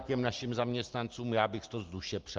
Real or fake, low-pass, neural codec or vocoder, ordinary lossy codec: real; 7.2 kHz; none; Opus, 24 kbps